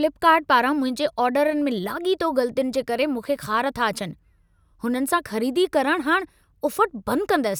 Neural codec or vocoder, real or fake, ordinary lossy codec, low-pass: none; real; none; none